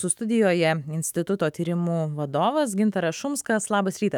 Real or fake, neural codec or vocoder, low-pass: fake; autoencoder, 48 kHz, 128 numbers a frame, DAC-VAE, trained on Japanese speech; 19.8 kHz